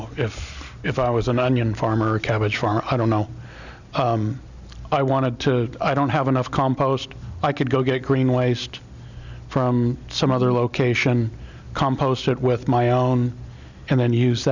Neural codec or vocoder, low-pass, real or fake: none; 7.2 kHz; real